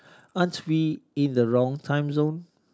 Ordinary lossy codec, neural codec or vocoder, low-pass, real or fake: none; none; none; real